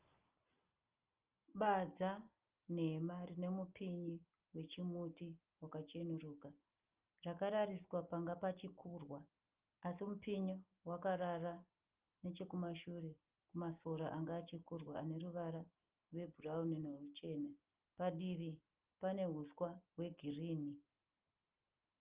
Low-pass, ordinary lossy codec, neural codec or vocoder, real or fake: 3.6 kHz; Opus, 32 kbps; none; real